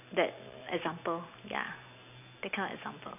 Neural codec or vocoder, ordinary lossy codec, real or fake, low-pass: none; none; real; 3.6 kHz